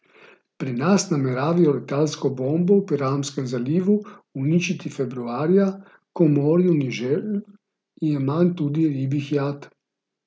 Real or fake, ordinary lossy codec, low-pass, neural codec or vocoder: real; none; none; none